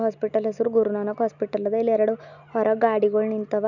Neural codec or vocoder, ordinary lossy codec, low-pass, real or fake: none; none; 7.2 kHz; real